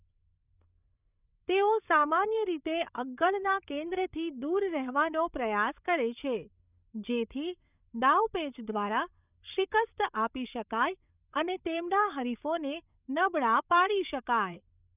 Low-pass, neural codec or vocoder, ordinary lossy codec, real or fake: 3.6 kHz; codec, 16 kHz, 8 kbps, FreqCodec, larger model; none; fake